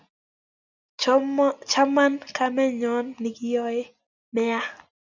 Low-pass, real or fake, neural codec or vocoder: 7.2 kHz; real; none